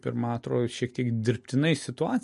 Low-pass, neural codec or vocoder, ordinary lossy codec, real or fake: 14.4 kHz; none; MP3, 48 kbps; real